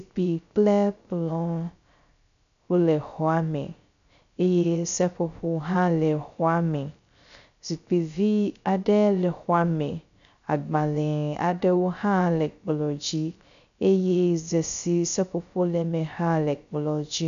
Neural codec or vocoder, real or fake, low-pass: codec, 16 kHz, 0.3 kbps, FocalCodec; fake; 7.2 kHz